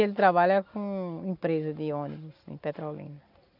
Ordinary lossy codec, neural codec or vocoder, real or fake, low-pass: none; none; real; 5.4 kHz